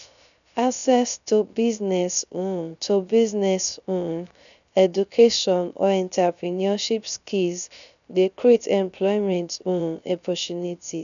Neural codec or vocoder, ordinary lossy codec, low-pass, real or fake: codec, 16 kHz, 0.3 kbps, FocalCodec; none; 7.2 kHz; fake